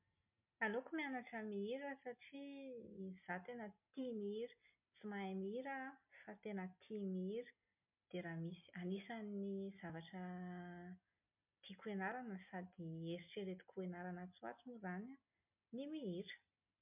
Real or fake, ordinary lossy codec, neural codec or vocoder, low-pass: real; MP3, 32 kbps; none; 3.6 kHz